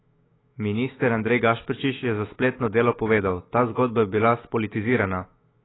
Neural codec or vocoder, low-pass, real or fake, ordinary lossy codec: autoencoder, 48 kHz, 128 numbers a frame, DAC-VAE, trained on Japanese speech; 7.2 kHz; fake; AAC, 16 kbps